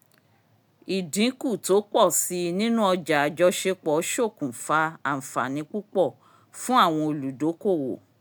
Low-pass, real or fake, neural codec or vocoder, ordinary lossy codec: none; real; none; none